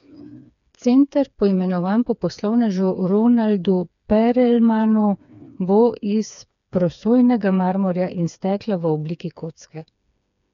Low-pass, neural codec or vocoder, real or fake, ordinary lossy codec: 7.2 kHz; codec, 16 kHz, 4 kbps, FreqCodec, smaller model; fake; none